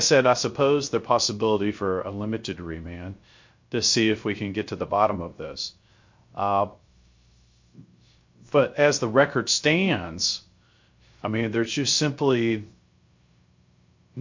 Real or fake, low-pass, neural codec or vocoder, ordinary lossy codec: fake; 7.2 kHz; codec, 16 kHz, 0.3 kbps, FocalCodec; MP3, 48 kbps